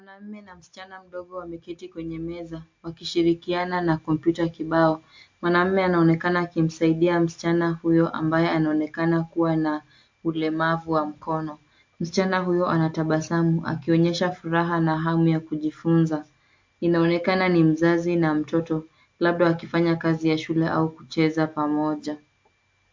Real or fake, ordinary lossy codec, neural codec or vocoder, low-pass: real; MP3, 48 kbps; none; 7.2 kHz